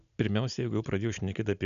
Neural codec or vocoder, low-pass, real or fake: none; 7.2 kHz; real